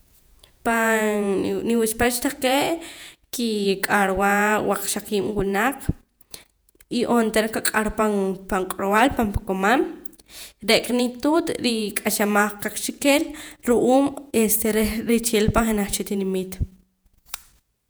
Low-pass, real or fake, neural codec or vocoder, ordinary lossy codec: none; fake; vocoder, 48 kHz, 128 mel bands, Vocos; none